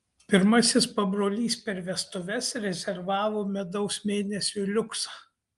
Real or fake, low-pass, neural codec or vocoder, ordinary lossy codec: real; 10.8 kHz; none; Opus, 32 kbps